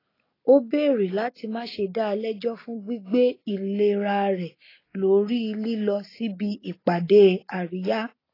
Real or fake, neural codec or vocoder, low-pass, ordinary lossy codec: real; none; 5.4 kHz; AAC, 24 kbps